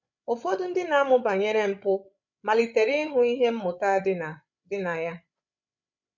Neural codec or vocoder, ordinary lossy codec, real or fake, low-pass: codec, 16 kHz, 16 kbps, FreqCodec, larger model; none; fake; 7.2 kHz